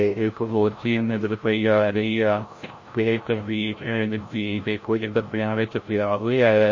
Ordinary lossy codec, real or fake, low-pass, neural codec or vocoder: MP3, 32 kbps; fake; 7.2 kHz; codec, 16 kHz, 0.5 kbps, FreqCodec, larger model